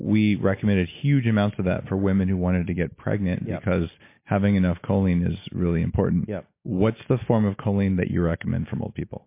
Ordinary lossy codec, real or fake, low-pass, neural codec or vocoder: MP3, 24 kbps; real; 3.6 kHz; none